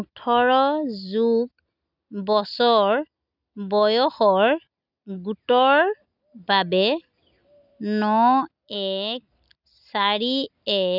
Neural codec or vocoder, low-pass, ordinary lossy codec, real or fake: none; 5.4 kHz; none; real